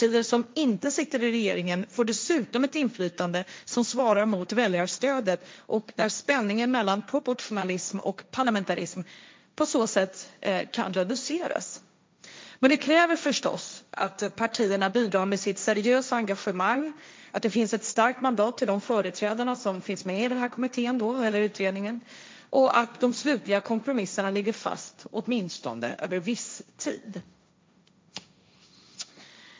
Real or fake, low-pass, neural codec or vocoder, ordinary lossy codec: fake; none; codec, 16 kHz, 1.1 kbps, Voila-Tokenizer; none